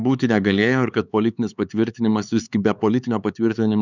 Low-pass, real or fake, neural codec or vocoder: 7.2 kHz; fake; codec, 16 kHz, 4 kbps, X-Codec, HuBERT features, trained on LibriSpeech